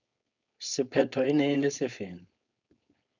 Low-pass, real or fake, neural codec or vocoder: 7.2 kHz; fake; codec, 16 kHz, 4.8 kbps, FACodec